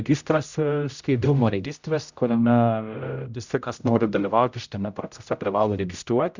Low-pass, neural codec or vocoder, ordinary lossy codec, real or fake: 7.2 kHz; codec, 16 kHz, 0.5 kbps, X-Codec, HuBERT features, trained on general audio; Opus, 64 kbps; fake